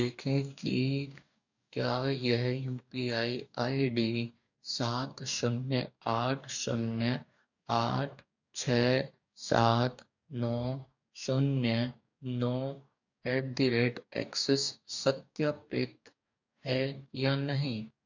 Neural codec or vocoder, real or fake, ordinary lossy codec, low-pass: codec, 44.1 kHz, 2.6 kbps, DAC; fake; none; 7.2 kHz